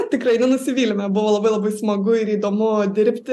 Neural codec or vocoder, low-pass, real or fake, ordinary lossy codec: none; 14.4 kHz; real; AAC, 96 kbps